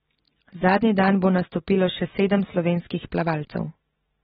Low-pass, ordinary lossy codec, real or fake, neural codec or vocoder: 14.4 kHz; AAC, 16 kbps; real; none